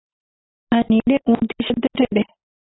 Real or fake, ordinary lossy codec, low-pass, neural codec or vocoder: real; AAC, 16 kbps; 7.2 kHz; none